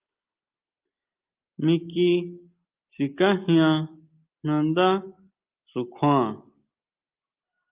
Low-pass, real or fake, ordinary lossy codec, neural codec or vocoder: 3.6 kHz; real; Opus, 24 kbps; none